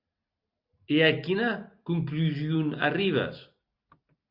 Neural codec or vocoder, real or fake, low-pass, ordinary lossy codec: none; real; 5.4 kHz; Opus, 64 kbps